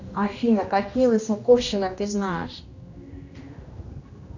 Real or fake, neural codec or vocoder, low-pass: fake; codec, 16 kHz, 1 kbps, X-Codec, HuBERT features, trained on general audio; 7.2 kHz